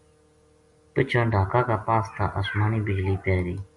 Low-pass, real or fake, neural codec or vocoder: 10.8 kHz; real; none